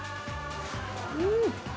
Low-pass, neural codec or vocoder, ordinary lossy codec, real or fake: none; none; none; real